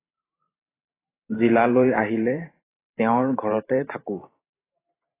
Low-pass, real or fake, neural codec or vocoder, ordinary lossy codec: 3.6 kHz; fake; vocoder, 44.1 kHz, 128 mel bands every 512 samples, BigVGAN v2; AAC, 16 kbps